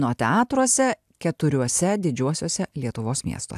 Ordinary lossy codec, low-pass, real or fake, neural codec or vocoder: AAC, 96 kbps; 14.4 kHz; real; none